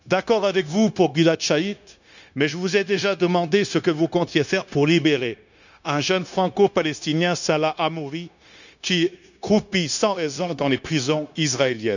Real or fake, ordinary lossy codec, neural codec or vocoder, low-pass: fake; none; codec, 16 kHz, 0.9 kbps, LongCat-Audio-Codec; 7.2 kHz